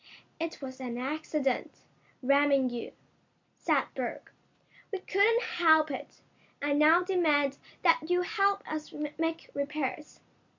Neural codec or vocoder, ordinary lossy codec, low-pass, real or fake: none; MP3, 48 kbps; 7.2 kHz; real